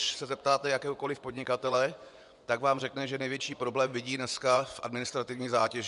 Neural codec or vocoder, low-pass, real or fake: vocoder, 24 kHz, 100 mel bands, Vocos; 10.8 kHz; fake